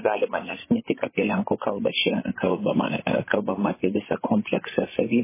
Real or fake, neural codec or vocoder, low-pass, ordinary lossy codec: fake; codec, 16 kHz, 8 kbps, FreqCodec, larger model; 3.6 kHz; MP3, 16 kbps